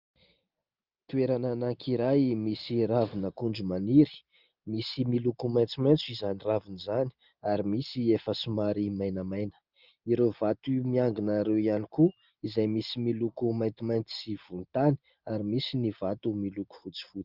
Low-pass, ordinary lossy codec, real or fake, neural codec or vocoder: 5.4 kHz; Opus, 24 kbps; real; none